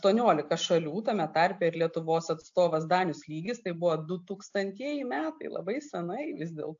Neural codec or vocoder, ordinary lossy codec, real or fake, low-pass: none; AAC, 64 kbps; real; 7.2 kHz